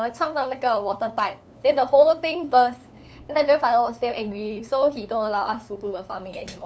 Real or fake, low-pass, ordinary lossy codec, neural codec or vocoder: fake; none; none; codec, 16 kHz, 2 kbps, FunCodec, trained on LibriTTS, 25 frames a second